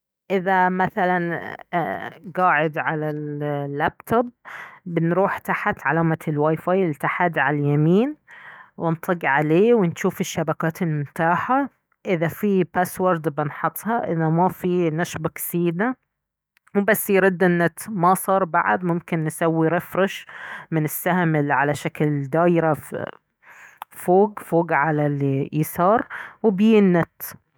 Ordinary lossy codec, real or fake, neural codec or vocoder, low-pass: none; fake; autoencoder, 48 kHz, 128 numbers a frame, DAC-VAE, trained on Japanese speech; none